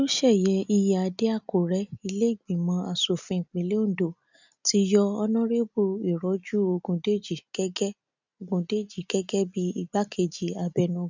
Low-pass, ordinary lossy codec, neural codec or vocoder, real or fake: 7.2 kHz; none; none; real